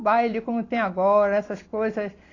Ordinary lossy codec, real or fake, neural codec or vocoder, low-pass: AAC, 32 kbps; real; none; 7.2 kHz